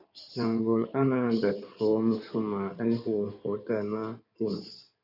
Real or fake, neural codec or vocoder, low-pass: fake; codec, 16 kHz in and 24 kHz out, 2.2 kbps, FireRedTTS-2 codec; 5.4 kHz